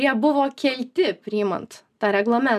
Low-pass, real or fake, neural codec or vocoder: 14.4 kHz; real; none